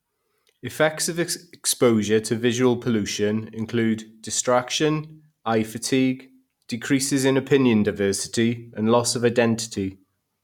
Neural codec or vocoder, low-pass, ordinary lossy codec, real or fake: none; 19.8 kHz; none; real